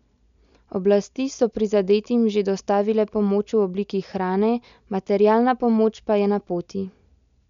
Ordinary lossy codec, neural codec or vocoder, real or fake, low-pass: none; none; real; 7.2 kHz